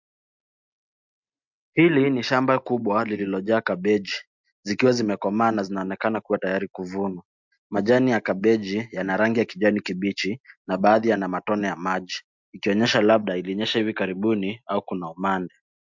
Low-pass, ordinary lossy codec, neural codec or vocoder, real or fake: 7.2 kHz; MP3, 64 kbps; none; real